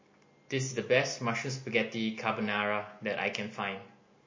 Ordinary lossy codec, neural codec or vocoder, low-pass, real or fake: MP3, 32 kbps; none; 7.2 kHz; real